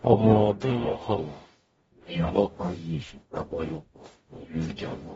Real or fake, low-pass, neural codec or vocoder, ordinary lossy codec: fake; 19.8 kHz; codec, 44.1 kHz, 0.9 kbps, DAC; AAC, 24 kbps